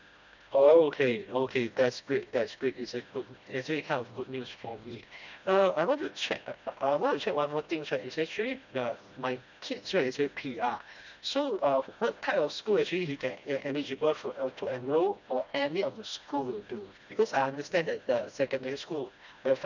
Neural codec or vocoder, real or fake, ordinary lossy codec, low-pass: codec, 16 kHz, 1 kbps, FreqCodec, smaller model; fake; none; 7.2 kHz